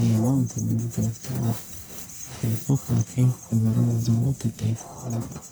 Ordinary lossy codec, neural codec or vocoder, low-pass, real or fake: none; codec, 44.1 kHz, 1.7 kbps, Pupu-Codec; none; fake